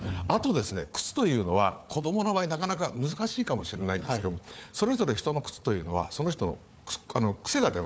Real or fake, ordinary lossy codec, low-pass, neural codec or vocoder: fake; none; none; codec, 16 kHz, 4 kbps, FunCodec, trained on Chinese and English, 50 frames a second